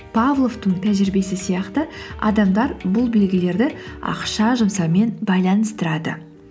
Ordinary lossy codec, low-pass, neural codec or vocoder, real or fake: none; none; none; real